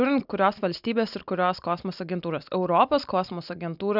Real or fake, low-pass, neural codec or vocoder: real; 5.4 kHz; none